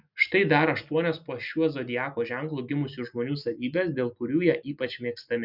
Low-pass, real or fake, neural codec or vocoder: 5.4 kHz; real; none